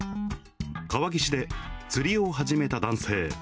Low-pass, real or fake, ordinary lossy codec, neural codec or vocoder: none; real; none; none